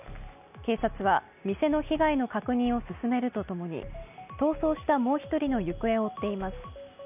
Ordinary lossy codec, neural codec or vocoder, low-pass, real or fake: none; none; 3.6 kHz; real